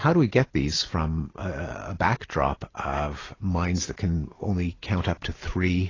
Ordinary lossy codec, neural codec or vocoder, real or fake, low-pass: AAC, 32 kbps; none; real; 7.2 kHz